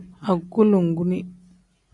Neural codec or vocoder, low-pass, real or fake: none; 10.8 kHz; real